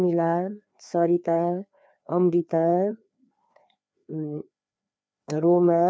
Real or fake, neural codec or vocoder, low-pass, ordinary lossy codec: fake; codec, 16 kHz, 2 kbps, FreqCodec, larger model; none; none